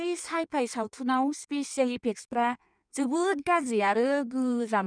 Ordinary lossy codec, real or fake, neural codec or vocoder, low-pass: none; fake; codec, 16 kHz in and 24 kHz out, 1.1 kbps, FireRedTTS-2 codec; 9.9 kHz